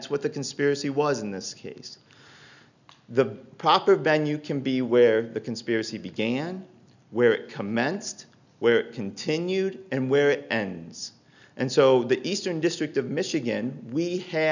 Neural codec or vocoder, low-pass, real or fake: none; 7.2 kHz; real